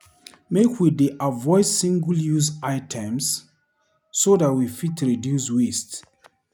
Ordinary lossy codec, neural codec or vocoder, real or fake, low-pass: none; none; real; none